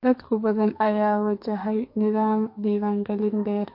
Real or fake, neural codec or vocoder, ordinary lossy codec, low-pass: fake; codec, 44.1 kHz, 2.6 kbps, SNAC; MP3, 32 kbps; 5.4 kHz